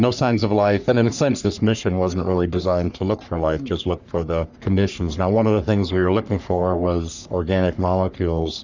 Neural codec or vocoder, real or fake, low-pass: codec, 44.1 kHz, 3.4 kbps, Pupu-Codec; fake; 7.2 kHz